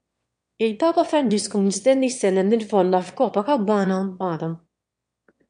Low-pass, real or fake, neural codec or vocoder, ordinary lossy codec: 9.9 kHz; fake; autoencoder, 22.05 kHz, a latent of 192 numbers a frame, VITS, trained on one speaker; MP3, 64 kbps